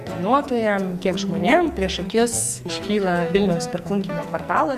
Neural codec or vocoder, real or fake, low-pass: codec, 44.1 kHz, 2.6 kbps, SNAC; fake; 14.4 kHz